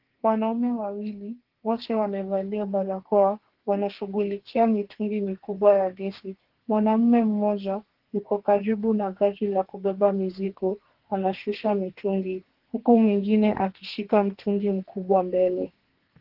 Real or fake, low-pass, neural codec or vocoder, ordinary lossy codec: fake; 5.4 kHz; codec, 32 kHz, 1.9 kbps, SNAC; Opus, 16 kbps